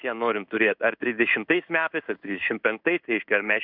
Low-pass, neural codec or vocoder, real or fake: 5.4 kHz; codec, 16 kHz in and 24 kHz out, 1 kbps, XY-Tokenizer; fake